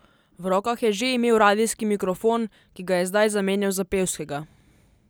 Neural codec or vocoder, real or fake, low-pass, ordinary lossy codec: none; real; none; none